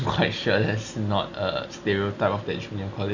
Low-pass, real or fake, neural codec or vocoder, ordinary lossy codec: 7.2 kHz; real; none; none